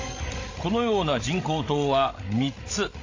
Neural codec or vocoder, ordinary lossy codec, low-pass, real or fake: codec, 16 kHz, 16 kbps, FreqCodec, larger model; AAC, 32 kbps; 7.2 kHz; fake